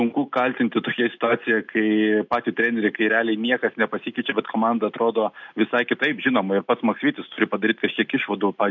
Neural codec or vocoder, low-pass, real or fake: none; 7.2 kHz; real